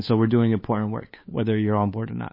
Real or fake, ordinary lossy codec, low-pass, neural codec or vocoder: fake; MP3, 24 kbps; 5.4 kHz; codec, 16 kHz, 8 kbps, FunCodec, trained on Chinese and English, 25 frames a second